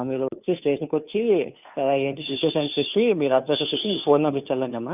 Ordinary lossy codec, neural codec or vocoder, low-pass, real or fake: none; codec, 16 kHz, 2 kbps, FunCodec, trained on Chinese and English, 25 frames a second; 3.6 kHz; fake